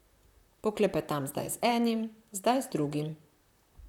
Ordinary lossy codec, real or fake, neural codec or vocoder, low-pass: none; fake; vocoder, 44.1 kHz, 128 mel bands, Pupu-Vocoder; 19.8 kHz